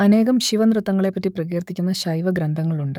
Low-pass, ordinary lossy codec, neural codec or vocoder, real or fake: 19.8 kHz; none; codec, 44.1 kHz, 7.8 kbps, DAC; fake